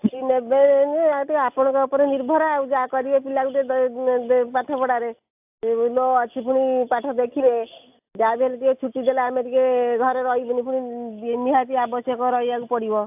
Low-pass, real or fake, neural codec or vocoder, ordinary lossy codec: 3.6 kHz; real; none; AAC, 32 kbps